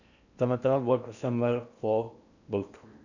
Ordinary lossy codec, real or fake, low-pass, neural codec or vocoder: none; fake; 7.2 kHz; codec, 16 kHz in and 24 kHz out, 0.8 kbps, FocalCodec, streaming, 65536 codes